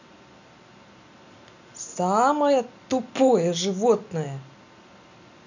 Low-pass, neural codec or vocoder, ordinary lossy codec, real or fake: 7.2 kHz; none; none; real